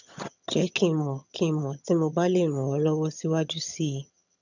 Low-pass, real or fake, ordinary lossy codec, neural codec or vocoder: 7.2 kHz; fake; none; vocoder, 22.05 kHz, 80 mel bands, HiFi-GAN